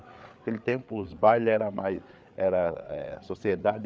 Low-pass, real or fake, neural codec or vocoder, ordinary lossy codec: none; fake; codec, 16 kHz, 8 kbps, FreqCodec, larger model; none